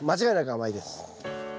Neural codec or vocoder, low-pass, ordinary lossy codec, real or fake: none; none; none; real